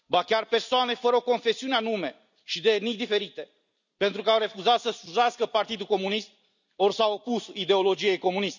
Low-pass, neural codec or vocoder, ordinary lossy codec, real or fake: 7.2 kHz; none; none; real